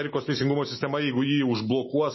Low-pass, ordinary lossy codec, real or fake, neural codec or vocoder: 7.2 kHz; MP3, 24 kbps; real; none